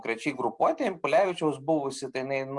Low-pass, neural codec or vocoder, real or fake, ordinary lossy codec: 10.8 kHz; none; real; Opus, 64 kbps